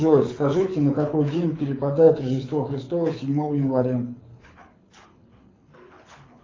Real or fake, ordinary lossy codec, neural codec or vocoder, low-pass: fake; AAC, 32 kbps; codec, 24 kHz, 6 kbps, HILCodec; 7.2 kHz